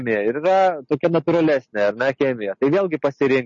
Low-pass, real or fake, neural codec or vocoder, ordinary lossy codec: 7.2 kHz; real; none; MP3, 32 kbps